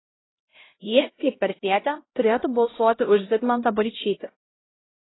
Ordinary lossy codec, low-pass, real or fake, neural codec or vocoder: AAC, 16 kbps; 7.2 kHz; fake; codec, 16 kHz, 0.5 kbps, X-Codec, HuBERT features, trained on LibriSpeech